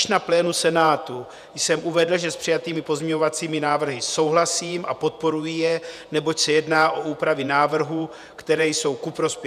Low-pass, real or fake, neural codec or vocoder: 14.4 kHz; fake; vocoder, 48 kHz, 128 mel bands, Vocos